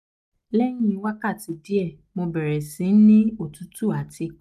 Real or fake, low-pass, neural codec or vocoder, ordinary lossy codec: real; 14.4 kHz; none; none